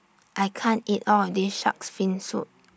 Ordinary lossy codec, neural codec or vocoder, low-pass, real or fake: none; none; none; real